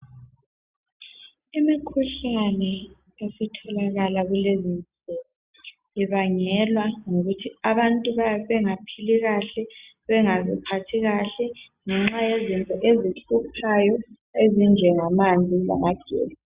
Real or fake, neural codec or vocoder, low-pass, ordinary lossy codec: real; none; 3.6 kHz; Opus, 64 kbps